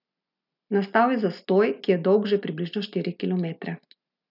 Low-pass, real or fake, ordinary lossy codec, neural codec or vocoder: 5.4 kHz; real; none; none